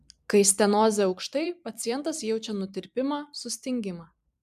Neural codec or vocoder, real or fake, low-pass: vocoder, 44.1 kHz, 128 mel bands every 256 samples, BigVGAN v2; fake; 14.4 kHz